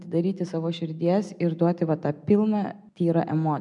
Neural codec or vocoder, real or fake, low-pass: none; real; 10.8 kHz